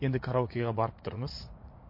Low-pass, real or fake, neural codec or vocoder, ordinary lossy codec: 5.4 kHz; fake; vocoder, 44.1 kHz, 80 mel bands, Vocos; MP3, 32 kbps